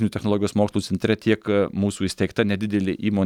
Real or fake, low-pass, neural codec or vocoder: real; 19.8 kHz; none